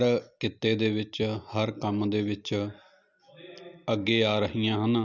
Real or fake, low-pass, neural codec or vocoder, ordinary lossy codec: real; 7.2 kHz; none; none